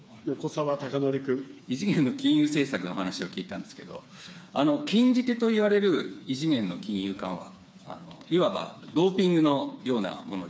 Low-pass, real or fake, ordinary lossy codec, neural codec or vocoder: none; fake; none; codec, 16 kHz, 4 kbps, FreqCodec, smaller model